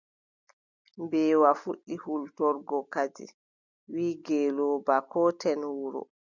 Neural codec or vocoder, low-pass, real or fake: none; 7.2 kHz; real